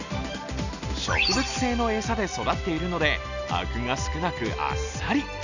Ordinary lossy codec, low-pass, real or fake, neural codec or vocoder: none; 7.2 kHz; real; none